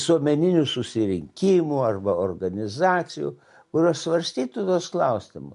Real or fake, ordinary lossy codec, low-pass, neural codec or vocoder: real; MP3, 64 kbps; 10.8 kHz; none